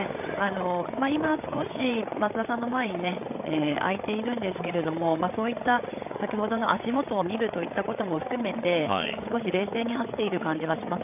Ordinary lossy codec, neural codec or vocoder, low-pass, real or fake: none; codec, 16 kHz, 8 kbps, FreqCodec, larger model; 3.6 kHz; fake